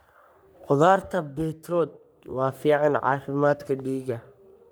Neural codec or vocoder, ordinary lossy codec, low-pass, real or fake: codec, 44.1 kHz, 3.4 kbps, Pupu-Codec; none; none; fake